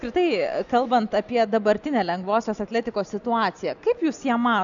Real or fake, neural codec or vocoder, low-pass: real; none; 7.2 kHz